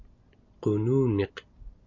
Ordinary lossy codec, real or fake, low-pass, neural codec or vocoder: MP3, 48 kbps; real; 7.2 kHz; none